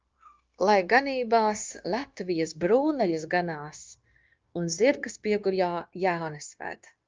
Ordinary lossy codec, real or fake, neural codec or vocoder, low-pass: Opus, 24 kbps; fake; codec, 16 kHz, 0.9 kbps, LongCat-Audio-Codec; 7.2 kHz